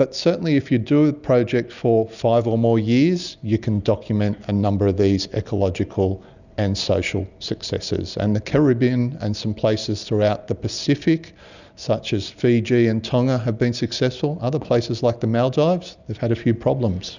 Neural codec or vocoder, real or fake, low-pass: none; real; 7.2 kHz